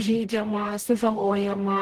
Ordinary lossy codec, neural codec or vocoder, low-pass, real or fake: Opus, 16 kbps; codec, 44.1 kHz, 0.9 kbps, DAC; 14.4 kHz; fake